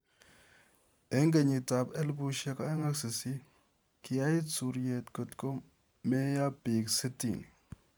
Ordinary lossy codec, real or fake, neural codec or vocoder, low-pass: none; fake; vocoder, 44.1 kHz, 128 mel bands every 512 samples, BigVGAN v2; none